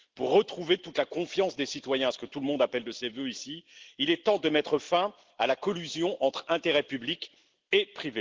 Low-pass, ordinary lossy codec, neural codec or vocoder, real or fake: 7.2 kHz; Opus, 16 kbps; none; real